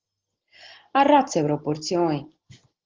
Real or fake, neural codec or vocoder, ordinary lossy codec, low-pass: real; none; Opus, 16 kbps; 7.2 kHz